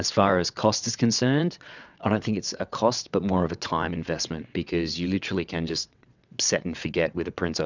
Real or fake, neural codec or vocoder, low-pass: fake; vocoder, 22.05 kHz, 80 mel bands, WaveNeXt; 7.2 kHz